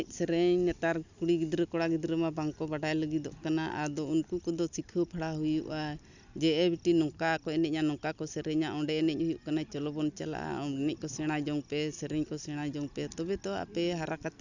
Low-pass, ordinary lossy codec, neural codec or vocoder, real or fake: 7.2 kHz; none; none; real